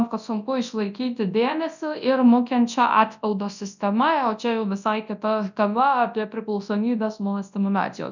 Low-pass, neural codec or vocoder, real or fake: 7.2 kHz; codec, 24 kHz, 0.9 kbps, WavTokenizer, large speech release; fake